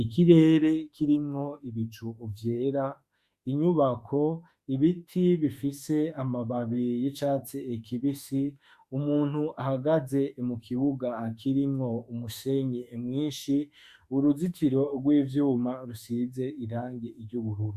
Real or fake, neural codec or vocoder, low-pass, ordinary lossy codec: fake; autoencoder, 48 kHz, 32 numbers a frame, DAC-VAE, trained on Japanese speech; 14.4 kHz; Opus, 64 kbps